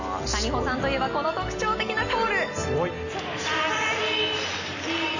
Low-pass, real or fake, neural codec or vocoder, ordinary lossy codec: 7.2 kHz; real; none; none